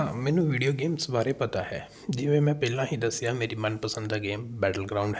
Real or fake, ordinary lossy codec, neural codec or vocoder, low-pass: real; none; none; none